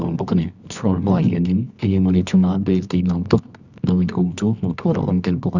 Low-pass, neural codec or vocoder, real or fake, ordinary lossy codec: 7.2 kHz; codec, 24 kHz, 0.9 kbps, WavTokenizer, medium music audio release; fake; none